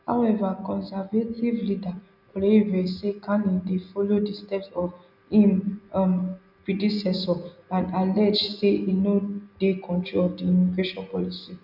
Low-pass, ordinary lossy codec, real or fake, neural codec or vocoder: 5.4 kHz; none; real; none